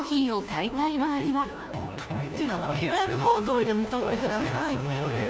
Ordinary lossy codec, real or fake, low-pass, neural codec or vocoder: none; fake; none; codec, 16 kHz, 1 kbps, FunCodec, trained on LibriTTS, 50 frames a second